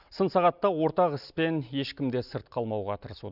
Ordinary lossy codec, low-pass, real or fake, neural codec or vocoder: none; 5.4 kHz; real; none